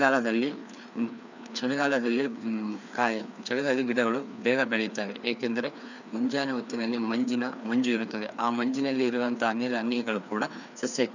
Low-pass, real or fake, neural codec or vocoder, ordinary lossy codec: 7.2 kHz; fake; codec, 16 kHz, 2 kbps, FreqCodec, larger model; none